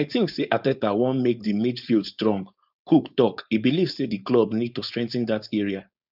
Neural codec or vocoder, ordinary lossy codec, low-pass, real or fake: codec, 16 kHz, 4.8 kbps, FACodec; none; 5.4 kHz; fake